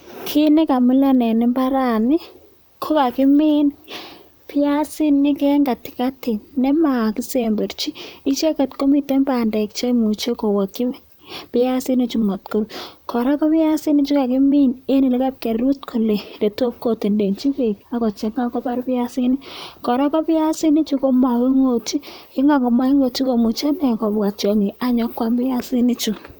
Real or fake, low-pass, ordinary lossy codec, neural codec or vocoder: fake; none; none; vocoder, 44.1 kHz, 128 mel bands, Pupu-Vocoder